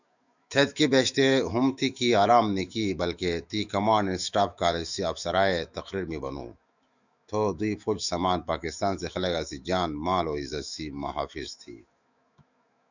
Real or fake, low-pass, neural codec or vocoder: fake; 7.2 kHz; autoencoder, 48 kHz, 128 numbers a frame, DAC-VAE, trained on Japanese speech